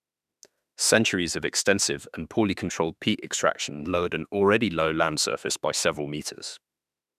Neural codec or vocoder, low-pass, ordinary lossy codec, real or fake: autoencoder, 48 kHz, 32 numbers a frame, DAC-VAE, trained on Japanese speech; 14.4 kHz; none; fake